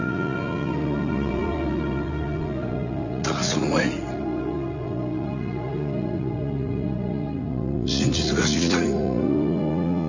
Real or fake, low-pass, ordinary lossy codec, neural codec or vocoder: fake; 7.2 kHz; none; vocoder, 44.1 kHz, 80 mel bands, Vocos